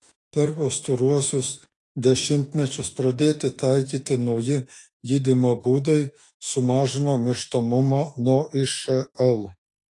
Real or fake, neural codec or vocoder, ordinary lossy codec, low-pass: fake; autoencoder, 48 kHz, 32 numbers a frame, DAC-VAE, trained on Japanese speech; AAC, 48 kbps; 10.8 kHz